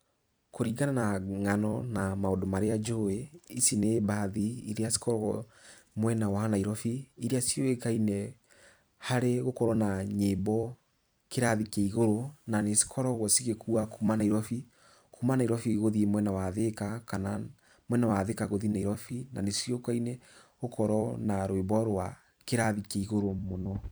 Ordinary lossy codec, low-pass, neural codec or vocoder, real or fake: none; none; vocoder, 44.1 kHz, 128 mel bands every 256 samples, BigVGAN v2; fake